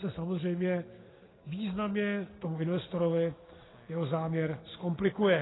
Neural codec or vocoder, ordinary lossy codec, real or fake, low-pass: none; AAC, 16 kbps; real; 7.2 kHz